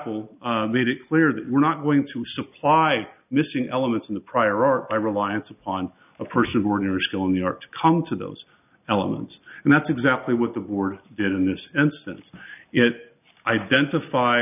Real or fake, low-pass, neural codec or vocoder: real; 3.6 kHz; none